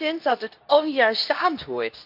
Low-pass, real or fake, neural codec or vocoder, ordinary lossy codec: 5.4 kHz; fake; codec, 24 kHz, 0.9 kbps, WavTokenizer, medium speech release version 2; none